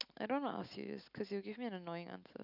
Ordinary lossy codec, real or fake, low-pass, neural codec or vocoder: none; real; 5.4 kHz; none